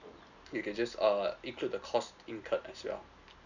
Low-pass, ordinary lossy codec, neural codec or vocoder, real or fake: 7.2 kHz; none; none; real